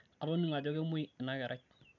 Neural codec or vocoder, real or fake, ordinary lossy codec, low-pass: none; real; none; 7.2 kHz